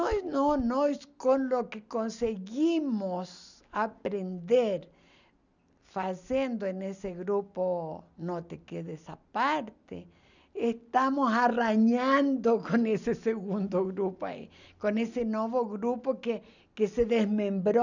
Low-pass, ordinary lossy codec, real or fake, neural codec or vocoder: 7.2 kHz; none; real; none